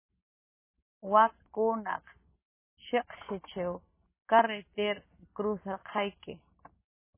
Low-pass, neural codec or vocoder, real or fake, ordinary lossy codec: 3.6 kHz; none; real; MP3, 16 kbps